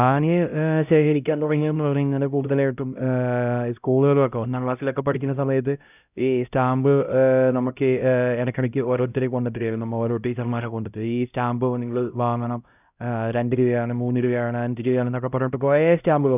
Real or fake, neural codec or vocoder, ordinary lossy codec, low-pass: fake; codec, 16 kHz, 0.5 kbps, X-Codec, HuBERT features, trained on LibriSpeech; none; 3.6 kHz